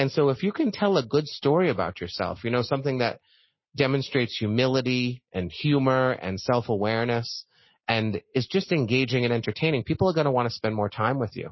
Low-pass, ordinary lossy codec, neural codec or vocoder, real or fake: 7.2 kHz; MP3, 24 kbps; none; real